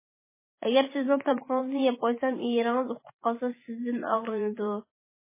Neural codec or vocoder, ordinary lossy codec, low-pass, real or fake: vocoder, 44.1 kHz, 128 mel bands every 512 samples, BigVGAN v2; MP3, 16 kbps; 3.6 kHz; fake